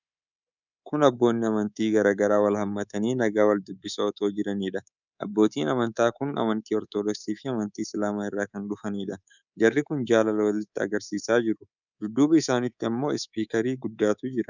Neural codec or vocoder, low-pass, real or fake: codec, 24 kHz, 3.1 kbps, DualCodec; 7.2 kHz; fake